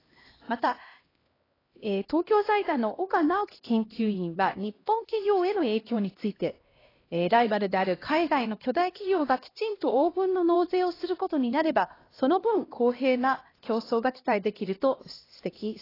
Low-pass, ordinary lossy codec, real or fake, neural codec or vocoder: 5.4 kHz; AAC, 24 kbps; fake; codec, 16 kHz, 1 kbps, X-Codec, HuBERT features, trained on LibriSpeech